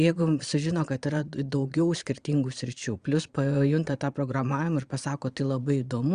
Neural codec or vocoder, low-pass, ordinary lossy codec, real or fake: vocoder, 22.05 kHz, 80 mel bands, Vocos; 9.9 kHz; Opus, 64 kbps; fake